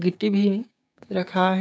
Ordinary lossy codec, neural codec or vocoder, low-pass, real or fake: none; codec, 16 kHz, 6 kbps, DAC; none; fake